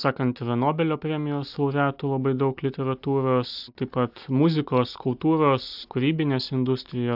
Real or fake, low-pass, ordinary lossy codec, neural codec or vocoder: real; 5.4 kHz; AAC, 48 kbps; none